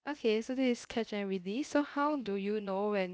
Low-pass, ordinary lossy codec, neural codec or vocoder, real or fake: none; none; codec, 16 kHz, 0.7 kbps, FocalCodec; fake